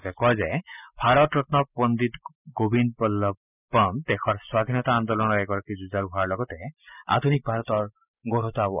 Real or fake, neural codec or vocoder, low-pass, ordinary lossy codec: real; none; 3.6 kHz; none